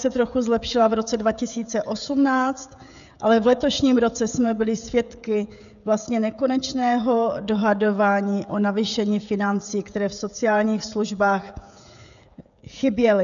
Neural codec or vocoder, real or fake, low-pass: codec, 16 kHz, 16 kbps, FreqCodec, smaller model; fake; 7.2 kHz